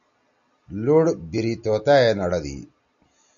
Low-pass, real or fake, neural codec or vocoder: 7.2 kHz; real; none